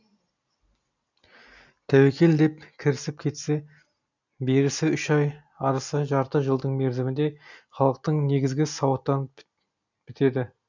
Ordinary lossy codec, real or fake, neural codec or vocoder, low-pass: none; real; none; 7.2 kHz